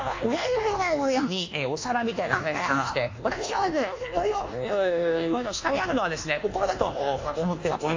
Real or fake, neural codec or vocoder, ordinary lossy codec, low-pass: fake; codec, 24 kHz, 1.2 kbps, DualCodec; AAC, 48 kbps; 7.2 kHz